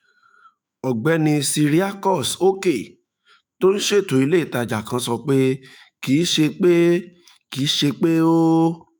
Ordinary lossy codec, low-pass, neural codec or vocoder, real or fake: none; none; autoencoder, 48 kHz, 128 numbers a frame, DAC-VAE, trained on Japanese speech; fake